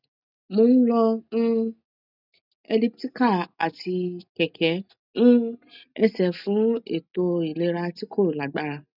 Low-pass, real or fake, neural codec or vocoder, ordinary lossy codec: 5.4 kHz; real; none; none